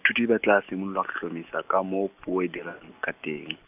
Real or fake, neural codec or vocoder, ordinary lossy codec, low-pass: real; none; none; 3.6 kHz